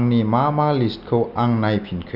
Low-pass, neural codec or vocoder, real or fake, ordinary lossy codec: 5.4 kHz; none; real; none